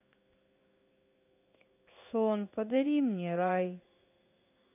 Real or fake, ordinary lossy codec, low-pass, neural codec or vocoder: fake; none; 3.6 kHz; codec, 16 kHz in and 24 kHz out, 1 kbps, XY-Tokenizer